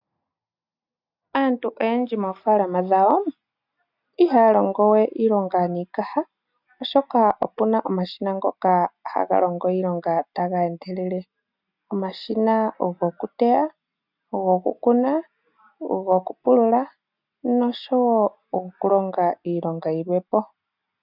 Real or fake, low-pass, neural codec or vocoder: real; 5.4 kHz; none